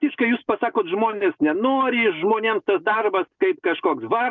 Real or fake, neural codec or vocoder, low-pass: real; none; 7.2 kHz